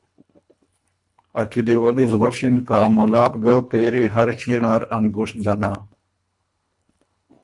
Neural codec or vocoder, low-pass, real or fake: codec, 24 kHz, 1.5 kbps, HILCodec; 10.8 kHz; fake